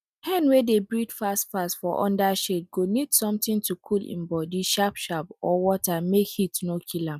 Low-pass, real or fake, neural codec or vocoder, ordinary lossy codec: 14.4 kHz; real; none; none